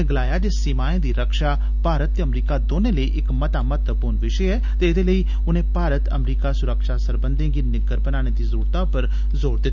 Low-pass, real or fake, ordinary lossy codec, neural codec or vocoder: 7.2 kHz; real; none; none